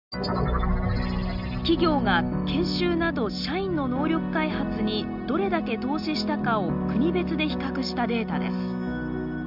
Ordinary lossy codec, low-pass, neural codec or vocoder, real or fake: none; 5.4 kHz; none; real